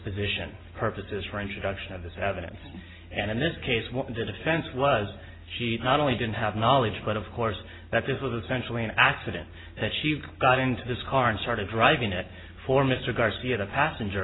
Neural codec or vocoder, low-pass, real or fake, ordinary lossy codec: none; 7.2 kHz; real; AAC, 16 kbps